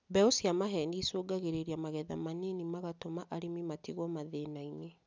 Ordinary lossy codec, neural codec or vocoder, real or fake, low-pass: none; none; real; none